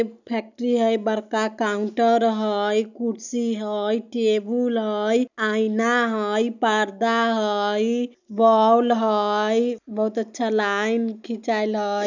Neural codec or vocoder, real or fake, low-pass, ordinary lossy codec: none; real; 7.2 kHz; none